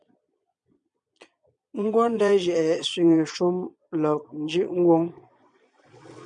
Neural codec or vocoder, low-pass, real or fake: vocoder, 22.05 kHz, 80 mel bands, Vocos; 9.9 kHz; fake